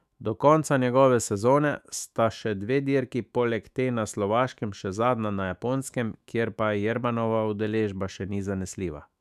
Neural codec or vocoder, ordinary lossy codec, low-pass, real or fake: autoencoder, 48 kHz, 128 numbers a frame, DAC-VAE, trained on Japanese speech; none; 14.4 kHz; fake